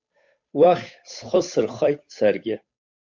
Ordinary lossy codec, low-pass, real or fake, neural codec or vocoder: MP3, 64 kbps; 7.2 kHz; fake; codec, 16 kHz, 8 kbps, FunCodec, trained on Chinese and English, 25 frames a second